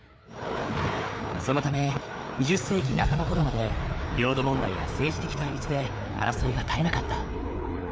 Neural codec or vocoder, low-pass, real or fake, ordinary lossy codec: codec, 16 kHz, 4 kbps, FreqCodec, larger model; none; fake; none